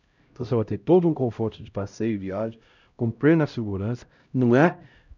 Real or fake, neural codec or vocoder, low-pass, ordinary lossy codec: fake; codec, 16 kHz, 0.5 kbps, X-Codec, HuBERT features, trained on LibriSpeech; 7.2 kHz; none